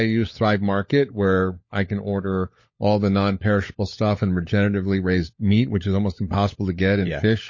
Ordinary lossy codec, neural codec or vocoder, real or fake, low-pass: MP3, 32 kbps; codec, 16 kHz, 16 kbps, FunCodec, trained on LibriTTS, 50 frames a second; fake; 7.2 kHz